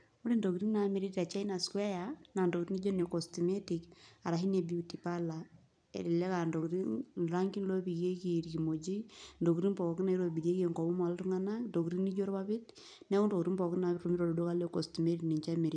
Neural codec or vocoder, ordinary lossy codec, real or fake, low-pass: vocoder, 44.1 kHz, 128 mel bands every 256 samples, BigVGAN v2; none; fake; 9.9 kHz